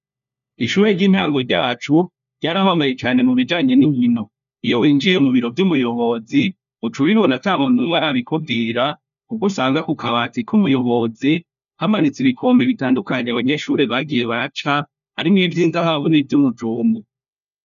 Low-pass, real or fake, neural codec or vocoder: 7.2 kHz; fake; codec, 16 kHz, 1 kbps, FunCodec, trained on LibriTTS, 50 frames a second